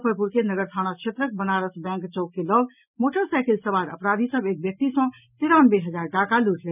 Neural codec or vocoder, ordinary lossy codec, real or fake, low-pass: none; none; real; 3.6 kHz